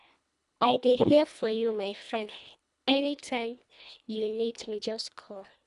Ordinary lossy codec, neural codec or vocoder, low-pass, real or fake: none; codec, 24 kHz, 1.5 kbps, HILCodec; 10.8 kHz; fake